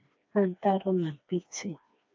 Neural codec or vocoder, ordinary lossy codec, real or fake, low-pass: codec, 16 kHz, 2 kbps, FreqCodec, smaller model; AAC, 48 kbps; fake; 7.2 kHz